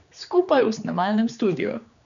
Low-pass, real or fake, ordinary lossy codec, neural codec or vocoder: 7.2 kHz; fake; none; codec, 16 kHz, 2 kbps, X-Codec, HuBERT features, trained on general audio